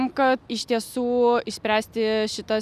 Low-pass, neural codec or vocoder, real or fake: 14.4 kHz; none; real